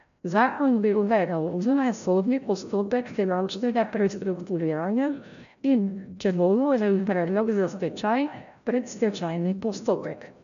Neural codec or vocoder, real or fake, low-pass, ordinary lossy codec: codec, 16 kHz, 0.5 kbps, FreqCodec, larger model; fake; 7.2 kHz; none